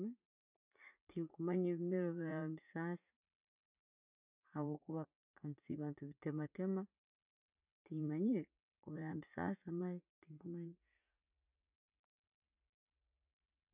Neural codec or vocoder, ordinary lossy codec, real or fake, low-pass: vocoder, 44.1 kHz, 80 mel bands, Vocos; none; fake; 3.6 kHz